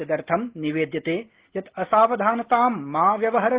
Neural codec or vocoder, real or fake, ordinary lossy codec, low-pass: none; real; Opus, 16 kbps; 3.6 kHz